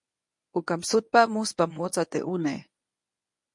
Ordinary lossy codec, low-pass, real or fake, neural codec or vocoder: MP3, 48 kbps; 10.8 kHz; fake; codec, 24 kHz, 0.9 kbps, WavTokenizer, medium speech release version 1